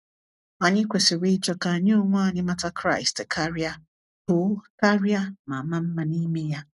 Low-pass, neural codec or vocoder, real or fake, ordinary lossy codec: 10.8 kHz; none; real; none